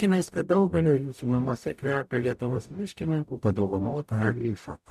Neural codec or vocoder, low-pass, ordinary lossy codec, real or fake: codec, 44.1 kHz, 0.9 kbps, DAC; 14.4 kHz; MP3, 96 kbps; fake